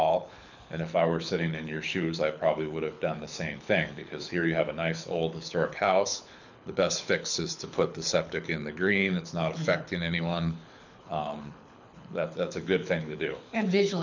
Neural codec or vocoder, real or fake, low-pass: codec, 24 kHz, 6 kbps, HILCodec; fake; 7.2 kHz